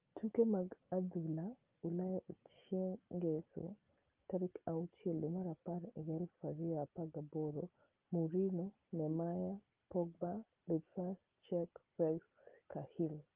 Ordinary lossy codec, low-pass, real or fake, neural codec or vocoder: Opus, 32 kbps; 3.6 kHz; real; none